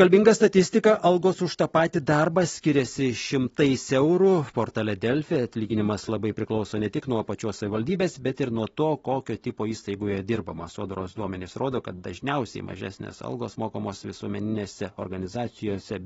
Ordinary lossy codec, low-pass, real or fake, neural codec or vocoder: AAC, 24 kbps; 19.8 kHz; real; none